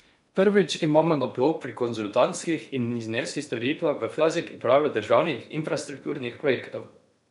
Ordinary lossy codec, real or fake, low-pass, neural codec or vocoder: none; fake; 10.8 kHz; codec, 16 kHz in and 24 kHz out, 0.8 kbps, FocalCodec, streaming, 65536 codes